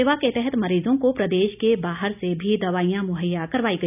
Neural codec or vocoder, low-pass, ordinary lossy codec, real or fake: none; 3.6 kHz; none; real